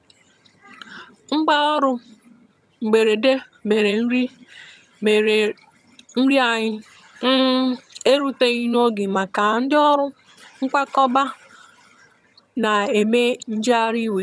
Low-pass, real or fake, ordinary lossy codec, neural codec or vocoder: none; fake; none; vocoder, 22.05 kHz, 80 mel bands, HiFi-GAN